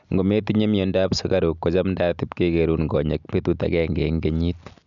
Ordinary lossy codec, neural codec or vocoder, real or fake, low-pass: none; none; real; 7.2 kHz